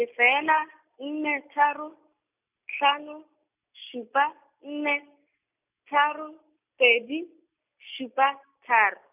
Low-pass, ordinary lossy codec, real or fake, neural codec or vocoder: 3.6 kHz; none; real; none